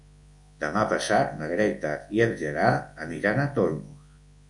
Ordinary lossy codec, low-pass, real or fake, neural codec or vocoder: MP3, 64 kbps; 10.8 kHz; fake; codec, 24 kHz, 0.9 kbps, WavTokenizer, large speech release